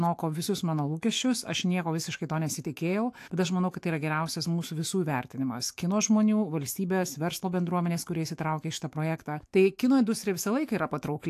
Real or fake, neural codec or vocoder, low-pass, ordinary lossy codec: fake; autoencoder, 48 kHz, 128 numbers a frame, DAC-VAE, trained on Japanese speech; 14.4 kHz; AAC, 64 kbps